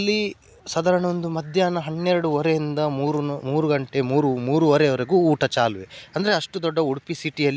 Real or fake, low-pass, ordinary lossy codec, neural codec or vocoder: real; none; none; none